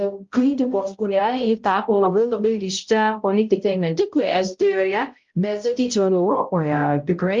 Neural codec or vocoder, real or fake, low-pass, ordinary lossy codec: codec, 16 kHz, 0.5 kbps, X-Codec, HuBERT features, trained on balanced general audio; fake; 7.2 kHz; Opus, 32 kbps